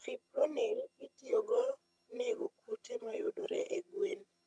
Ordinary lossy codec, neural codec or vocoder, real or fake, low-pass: none; vocoder, 22.05 kHz, 80 mel bands, HiFi-GAN; fake; none